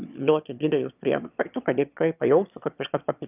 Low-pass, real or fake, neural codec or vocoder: 3.6 kHz; fake; autoencoder, 22.05 kHz, a latent of 192 numbers a frame, VITS, trained on one speaker